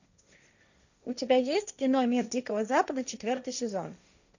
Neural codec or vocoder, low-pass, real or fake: codec, 16 kHz, 1.1 kbps, Voila-Tokenizer; 7.2 kHz; fake